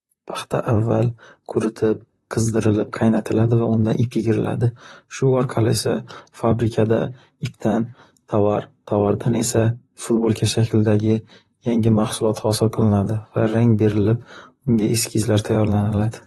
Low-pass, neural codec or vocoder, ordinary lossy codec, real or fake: 19.8 kHz; vocoder, 44.1 kHz, 128 mel bands, Pupu-Vocoder; AAC, 32 kbps; fake